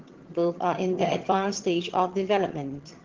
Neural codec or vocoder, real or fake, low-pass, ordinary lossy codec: vocoder, 22.05 kHz, 80 mel bands, HiFi-GAN; fake; 7.2 kHz; Opus, 16 kbps